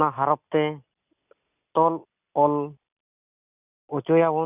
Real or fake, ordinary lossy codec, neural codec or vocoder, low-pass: real; none; none; 3.6 kHz